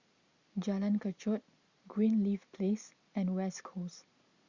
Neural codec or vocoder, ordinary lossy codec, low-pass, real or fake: none; Opus, 64 kbps; 7.2 kHz; real